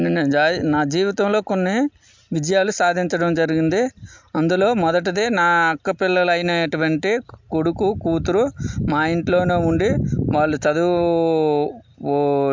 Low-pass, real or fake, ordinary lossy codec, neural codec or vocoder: 7.2 kHz; real; MP3, 64 kbps; none